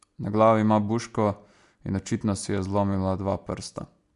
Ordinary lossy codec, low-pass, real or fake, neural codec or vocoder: MP3, 64 kbps; 10.8 kHz; real; none